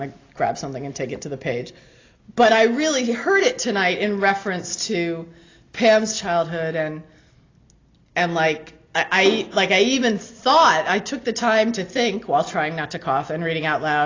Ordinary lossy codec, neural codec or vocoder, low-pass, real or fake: AAC, 32 kbps; none; 7.2 kHz; real